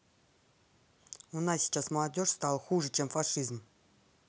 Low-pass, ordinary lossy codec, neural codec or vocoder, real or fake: none; none; none; real